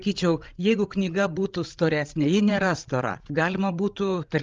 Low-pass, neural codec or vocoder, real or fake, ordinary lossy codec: 7.2 kHz; codec, 16 kHz, 16 kbps, FreqCodec, larger model; fake; Opus, 32 kbps